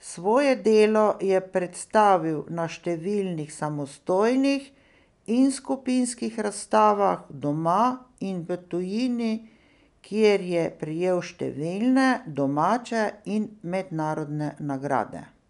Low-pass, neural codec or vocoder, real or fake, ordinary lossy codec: 10.8 kHz; none; real; none